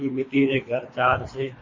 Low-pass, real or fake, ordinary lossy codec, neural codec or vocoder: 7.2 kHz; fake; MP3, 32 kbps; codec, 24 kHz, 3 kbps, HILCodec